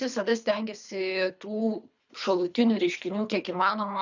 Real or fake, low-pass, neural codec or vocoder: fake; 7.2 kHz; codec, 24 kHz, 3 kbps, HILCodec